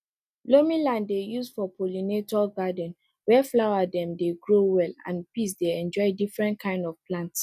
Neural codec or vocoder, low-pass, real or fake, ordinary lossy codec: none; 14.4 kHz; real; none